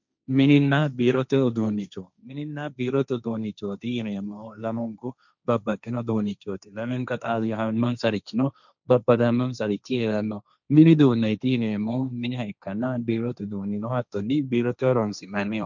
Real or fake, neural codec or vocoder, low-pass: fake; codec, 16 kHz, 1.1 kbps, Voila-Tokenizer; 7.2 kHz